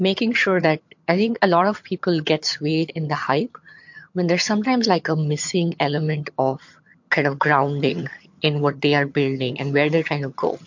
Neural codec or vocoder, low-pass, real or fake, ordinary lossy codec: vocoder, 22.05 kHz, 80 mel bands, HiFi-GAN; 7.2 kHz; fake; MP3, 48 kbps